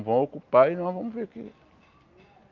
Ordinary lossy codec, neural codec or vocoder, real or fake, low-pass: Opus, 24 kbps; none; real; 7.2 kHz